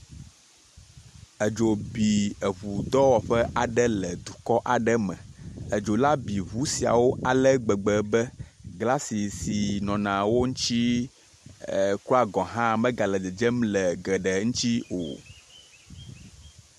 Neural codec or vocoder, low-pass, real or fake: none; 14.4 kHz; real